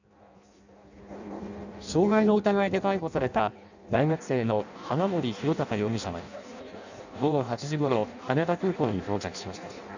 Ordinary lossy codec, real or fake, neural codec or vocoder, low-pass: none; fake; codec, 16 kHz in and 24 kHz out, 0.6 kbps, FireRedTTS-2 codec; 7.2 kHz